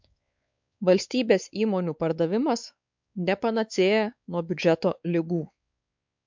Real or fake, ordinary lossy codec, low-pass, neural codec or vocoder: fake; MP3, 64 kbps; 7.2 kHz; codec, 16 kHz, 4 kbps, X-Codec, WavLM features, trained on Multilingual LibriSpeech